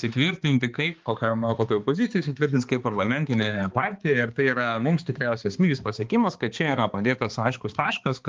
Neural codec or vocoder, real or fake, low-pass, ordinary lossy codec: codec, 16 kHz, 2 kbps, X-Codec, HuBERT features, trained on balanced general audio; fake; 7.2 kHz; Opus, 24 kbps